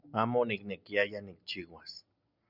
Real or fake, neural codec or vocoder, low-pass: real; none; 5.4 kHz